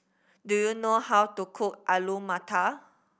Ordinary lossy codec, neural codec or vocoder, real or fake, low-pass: none; none; real; none